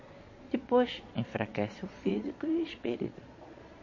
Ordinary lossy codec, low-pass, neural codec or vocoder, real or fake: MP3, 32 kbps; 7.2 kHz; vocoder, 22.05 kHz, 80 mel bands, Vocos; fake